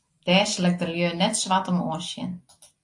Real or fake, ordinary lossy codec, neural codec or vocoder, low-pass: real; AAC, 64 kbps; none; 10.8 kHz